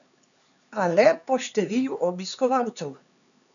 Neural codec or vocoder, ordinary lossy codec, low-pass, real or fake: codec, 16 kHz, 4 kbps, X-Codec, HuBERT features, trained on LibriSpeech; none; 7.2 kHz; fake